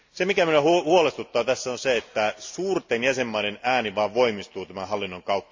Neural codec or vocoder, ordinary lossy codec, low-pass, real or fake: none; MP3, 48 kbps; 7.2 kHz; real